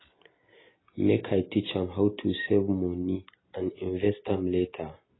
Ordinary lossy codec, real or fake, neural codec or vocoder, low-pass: AAC, 16 kbps; real; none; 7.2 kHz